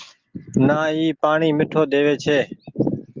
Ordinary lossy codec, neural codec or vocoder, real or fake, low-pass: Opus, 32 kbps; none; real; 7.2 kHz